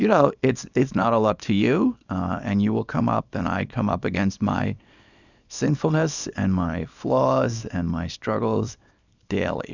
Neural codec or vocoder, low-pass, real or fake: codec, 24 kHz, 0.9 kbps, WavTokenizer, small release; 7.2 kHz; fake